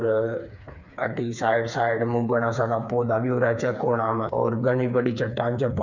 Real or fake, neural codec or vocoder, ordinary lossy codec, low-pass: fake; codec, 16 kHz, 4 kbps, FreqCodec, smaller model; none; 7.2 kHz